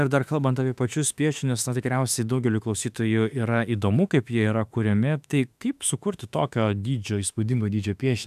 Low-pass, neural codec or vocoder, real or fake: 14.4 kHz; autoencoder, 48 kHz, 32 numbers a frame, DAC-VAE, trained on Japanese speech; fake